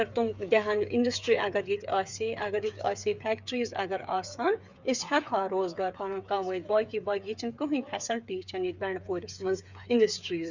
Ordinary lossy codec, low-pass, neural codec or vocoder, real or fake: Opus, 64 kbps; 7.2 kHz; codec, 16 kHz, 8 kbps, FreqCodec, smaller model; fake